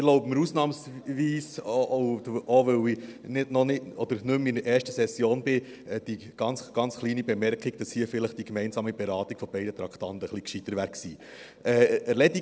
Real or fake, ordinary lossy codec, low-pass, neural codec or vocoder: real; none; none; none